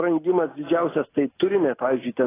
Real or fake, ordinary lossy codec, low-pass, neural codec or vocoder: real; AAC, 16 kbps; 3.6 kHz; none